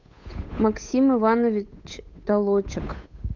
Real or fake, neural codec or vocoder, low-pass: fake; codec, 16 kHz, 8 kbps, FunCodec, trained on Chinese and English, 25 frames a second; 7.2 kHz